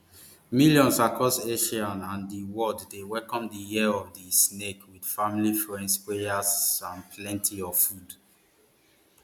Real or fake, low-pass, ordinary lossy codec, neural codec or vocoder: real; 19.8 kHz; none; none